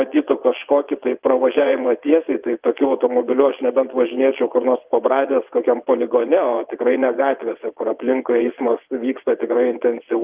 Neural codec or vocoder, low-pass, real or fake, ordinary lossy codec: vocoder, 22.05 kHz, 80 mel bands, WaveNeXt; 3.6 kHz; fake; Opus, 32 kbps